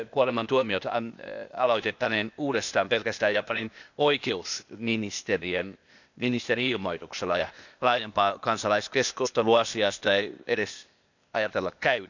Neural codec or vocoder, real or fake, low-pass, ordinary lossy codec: codec, 16 kHz, 0.8 kbps, ZipCodec; fake; 7.2 kHz; none